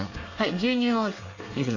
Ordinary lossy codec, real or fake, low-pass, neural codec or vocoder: AAC, 48 kbps; fake; 7.2 kHz; codec, 24 kHz, 1 kbps, SNAC